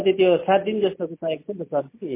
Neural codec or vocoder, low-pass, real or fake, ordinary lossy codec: none; 3.6 kHz; real; MP3, 24 kbps